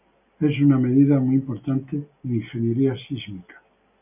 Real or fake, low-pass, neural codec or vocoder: real; 3.6 kHz; none